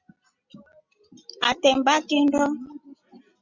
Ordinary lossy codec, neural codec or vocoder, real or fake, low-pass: Opus, 64 kbps; none; real; 7.2 kHz